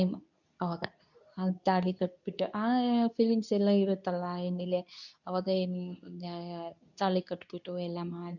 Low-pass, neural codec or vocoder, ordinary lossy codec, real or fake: 7.2 kHz; codec, 24 kHz, 0.9 kbps, WavTokenizer, medium speech release version 2; none; fake